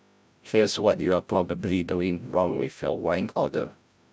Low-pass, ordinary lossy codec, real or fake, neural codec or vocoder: none; none; fake; codec, 16 kHz, 0.5 kbps, FreqCodec, larger model